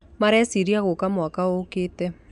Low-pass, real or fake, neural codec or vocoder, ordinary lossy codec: 10.8 kHz; real; none; none